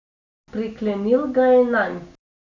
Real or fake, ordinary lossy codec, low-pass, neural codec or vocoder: real; none; 7.2 kHz; none